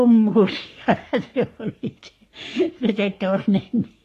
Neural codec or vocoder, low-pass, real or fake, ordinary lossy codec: codec, 44.1 kHz, 3.4 kbps, Pupu-Codec; 14.4 kHz; fake; AAC, 48 kbps